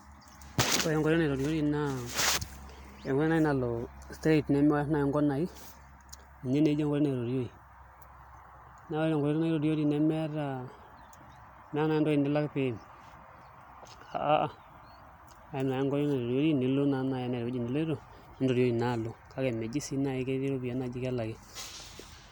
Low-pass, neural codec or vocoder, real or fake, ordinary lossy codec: none; none; real; none